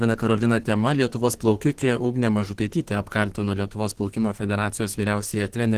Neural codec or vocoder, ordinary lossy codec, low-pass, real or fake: codec, 32 kHz, 1.9 kbps, SNAC; Opus, 16 kbps; 14.4 kHz; fake